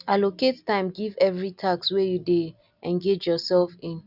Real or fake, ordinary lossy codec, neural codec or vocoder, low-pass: real; none; none; 5.4 kHz